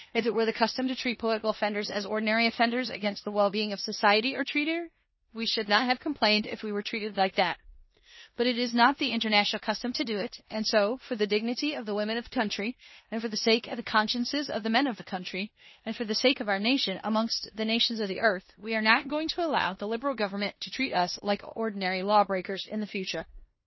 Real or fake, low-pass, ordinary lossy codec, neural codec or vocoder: fake; 7.2 kHz; MP3, 24 kbps; codec, 16 kHz in and 24 kHz out, 0.9 kbps, LongCat-Audio-Codec, four codebook decoder